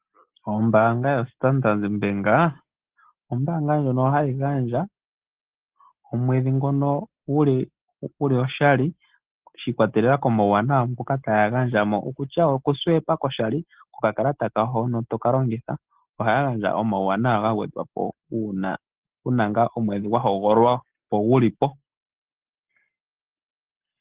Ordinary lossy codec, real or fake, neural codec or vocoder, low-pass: Opus, 16 kbps; real; none; 3.6 kHz